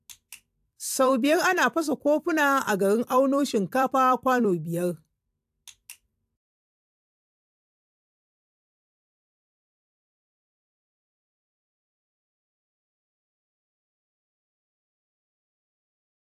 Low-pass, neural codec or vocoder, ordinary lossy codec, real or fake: 14.4 kHz; vocoder, 44.1 kHz, 128 mel bands every 256 samples, BigVGAN v2; AAC, 96 kbps; fake